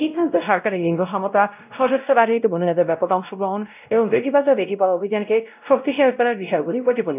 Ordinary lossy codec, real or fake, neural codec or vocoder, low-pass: none; fake; codec, 16 kHz, 0.5 kbps, X-Codec, WavLM features, trained on Multilingual LibriSpeech; 3.6 kHz